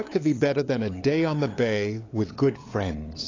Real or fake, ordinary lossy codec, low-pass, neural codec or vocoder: fake; AAC, 32 kbps; 7.2 kHz; codec, 16 kHz, 8 kbps, FunCodec, trained on LibriTTS, 25 frames a second